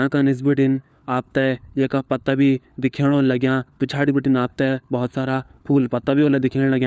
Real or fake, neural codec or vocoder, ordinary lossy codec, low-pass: fake; codec, 16 kHz, 4 kbps, FunCodec, trained on LibriTTS, 50 frames a second; none; none